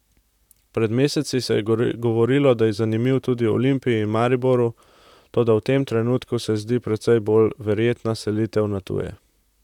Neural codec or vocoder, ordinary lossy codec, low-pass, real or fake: vocoder, 44.1 kHz, 128 mel bands, Pupu-Vocoder; none; 19.8 kHz; fake